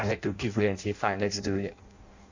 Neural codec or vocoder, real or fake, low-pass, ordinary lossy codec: codec, 16 kHz in and 24 kHz out, 0.6 kbps, FireRedTTS-2 codec; fake; 7.2 kHz; Opus, 64 kbps